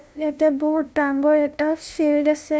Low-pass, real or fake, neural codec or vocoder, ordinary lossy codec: none; fake; codec, 16 kHz, 0.5 kbps, FunCodec, trained on LibriTTS, 25 frames a second; none